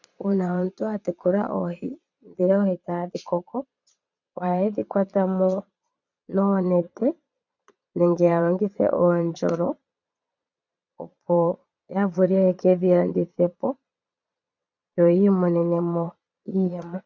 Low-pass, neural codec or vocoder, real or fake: 7.2 kHz; vocoder, 22.05 kHz, 80 mel bands, Vocos; fake